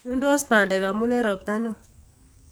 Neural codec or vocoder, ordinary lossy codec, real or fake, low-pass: codec, 44.1 kHz, 2.6 kbps, SNAC; none; fake; none